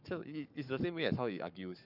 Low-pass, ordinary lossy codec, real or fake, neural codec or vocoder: 5.4 kHz; none; fake; codec, 44.1 kHz, 7.8 kbps, Pupu-Codec